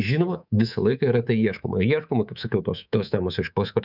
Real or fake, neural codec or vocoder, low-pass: fake; codec, 24 kHz, 3.1 kbps, DualCodec; 5.4 kHz